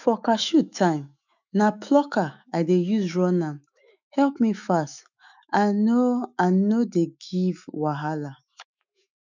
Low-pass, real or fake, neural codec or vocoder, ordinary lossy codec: 7.2 kHz; fake; autoencoder, 48 kHz, 128 numbers a frame, DAC-VAE, trained on Japanese speech; none